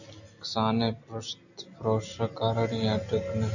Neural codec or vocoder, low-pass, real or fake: none; 7.2 kHz; real